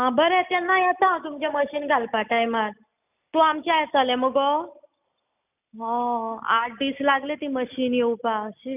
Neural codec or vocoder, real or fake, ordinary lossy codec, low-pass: none; real; none; 3.6 kHz